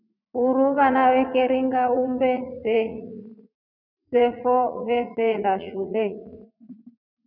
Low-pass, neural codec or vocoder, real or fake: 5.4 kHz; vocoder, 44.1 kHz, 80 mel bands, Vocos; fake